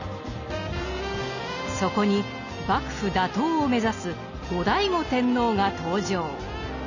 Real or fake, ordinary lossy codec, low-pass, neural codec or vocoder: real; none; 7.2 kHz; none